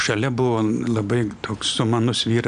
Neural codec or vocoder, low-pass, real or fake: none; 9.9 kHz; real